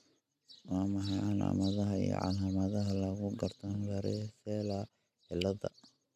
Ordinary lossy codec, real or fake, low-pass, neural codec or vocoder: none; real; 14.4 kHz; none